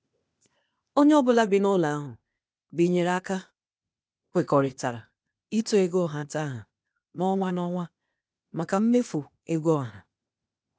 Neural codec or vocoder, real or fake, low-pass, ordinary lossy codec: codec, 16 kHz, 0.8 kbps, ZipCodec; fake; none; none